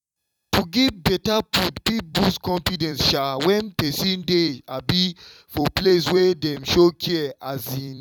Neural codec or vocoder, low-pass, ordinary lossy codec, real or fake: vocoder, 44.1 kHz, 128 mel bands every 256 samples, BigVGAN v2; 19.8 kHz; none; fake